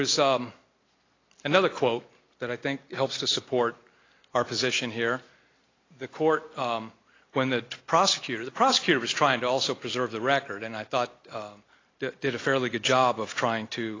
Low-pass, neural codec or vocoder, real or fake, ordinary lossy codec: 7.2 kHz; none; real; AAC, 32 kbps